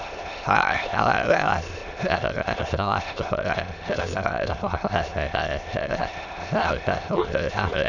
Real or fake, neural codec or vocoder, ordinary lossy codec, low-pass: fake; autoencoder, 22.05 kHz, a latent of 192 numbers a frame, VITS, trained on many speakers; none; 7.2 kHz